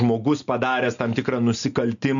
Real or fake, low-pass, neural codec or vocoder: real; 7.2 kHz; none